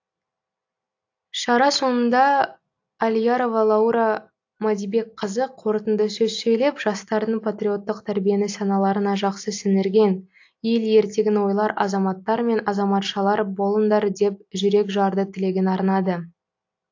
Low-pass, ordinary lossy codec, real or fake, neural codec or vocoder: 7.2 kHz; AAC, 48 kbps; real; none